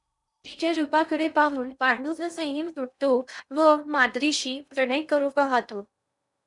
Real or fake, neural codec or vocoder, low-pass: fake; codec, 16 kHz in and 24 kHz out, 0.6 kbps, FocalCodec, streaming, 2048 codes; 10.8 kHz